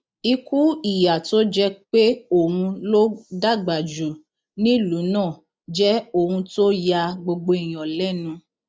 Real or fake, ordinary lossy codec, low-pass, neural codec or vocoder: real; none; none; none